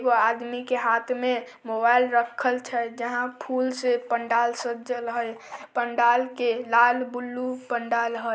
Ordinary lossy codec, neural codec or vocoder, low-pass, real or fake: none; none; none; real